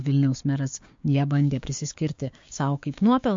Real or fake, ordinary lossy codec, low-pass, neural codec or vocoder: fake; MP3, 48 kbps; 7.2 kHz; codec, 16 kHz, 6 kbps, DAC